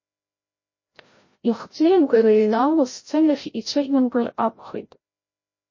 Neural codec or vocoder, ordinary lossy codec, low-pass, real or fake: codec, 16 kHz, 0.5 kbps, FreqCodec, larger model; MP3, 32 kbps; 7.2 kHz; fake